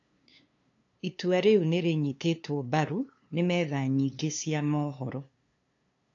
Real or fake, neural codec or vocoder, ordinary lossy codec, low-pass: fake; codec, 16 kHz, 2 kbps, FunCodec, trained on LibriTTS, 25 frames a second; AAC, 48 kbps; 7.2 kHz